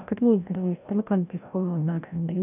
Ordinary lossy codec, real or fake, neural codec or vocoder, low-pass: none; fake; codec, 16 kHz, 0.5 kbps, FreqCodec, larger model; 3.6 kHz